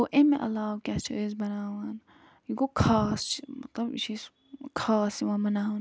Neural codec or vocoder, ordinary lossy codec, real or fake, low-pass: none; none; real; none